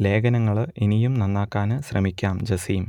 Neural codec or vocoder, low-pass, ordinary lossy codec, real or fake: none; 19.8 kHz; none; real